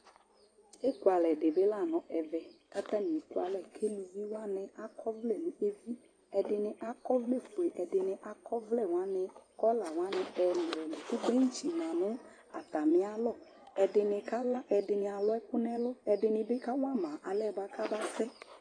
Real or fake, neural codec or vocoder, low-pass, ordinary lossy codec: fake; vocoder, 24 kHz, 100 mel bands, Vocos; 9.9 kHz; AAC, 32 kbps